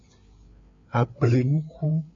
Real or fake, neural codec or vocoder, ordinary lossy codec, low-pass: fake; codec, 16 kHz, 4 kbps, FreqCodec, larger model; MP3, 48 kbps; 7.2 kHz